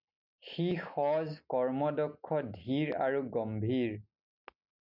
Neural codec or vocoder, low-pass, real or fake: none; 5.4 kHz; real